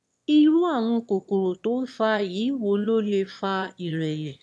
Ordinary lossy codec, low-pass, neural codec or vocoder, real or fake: none; none; autoencoder, 22.05 kHz, a latent of 192 numbers a frame, VITS, trained on one speaker; fake